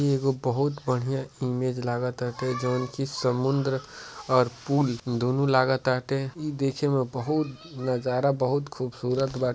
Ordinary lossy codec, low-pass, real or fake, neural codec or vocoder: none; none; real; none